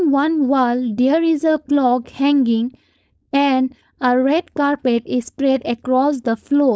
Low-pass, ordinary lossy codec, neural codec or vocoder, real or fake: none; none; codec, 16 kHz, 4.8 kbps, FACodec; fake